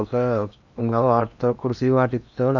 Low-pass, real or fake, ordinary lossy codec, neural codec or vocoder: 7.2 kHz; fake; none; codec, 16 kHz in and 24 kHz out, 0.8 kbps, FocalCodec, streaming, 65536 codes